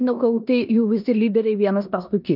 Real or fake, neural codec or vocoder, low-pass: fake; codec, 16 kHz in and 24 kHz out, 0.9 kbps, LongCat-Audio-Codec, four codebook decoder; 5.4 kHz